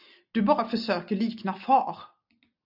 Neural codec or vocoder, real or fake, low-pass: none; real; 5.4 kHz